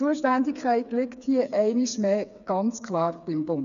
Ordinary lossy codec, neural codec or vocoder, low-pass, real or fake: none; codec, 16 kHz, 4 kbps, FreqCodec, smaller model; 7.2 kHz; fake